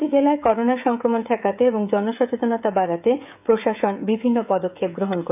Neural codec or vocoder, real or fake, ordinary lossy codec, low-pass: codec, 16 kHz, 16 kbps, FreqCodec, smaller model; fake; none; 3.6 kHz